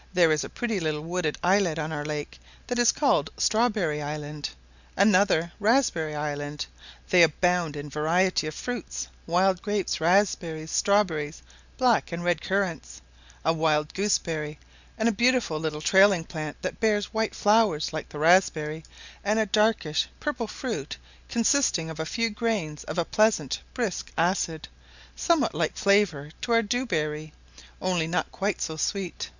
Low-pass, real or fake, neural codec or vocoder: 7.2 kHz; real; none